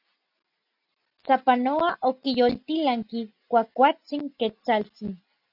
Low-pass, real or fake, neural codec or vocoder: 5.4 kHz; real; none